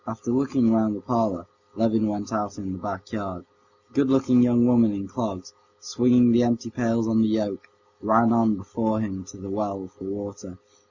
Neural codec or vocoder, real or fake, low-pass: none; real; 7.2 kHz